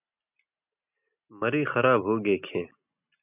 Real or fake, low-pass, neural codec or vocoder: real; 3.6 kHz; none